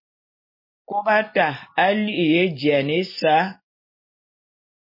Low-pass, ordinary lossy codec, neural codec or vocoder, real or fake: 5.4 kHz; MP3, 24 kbps; none; real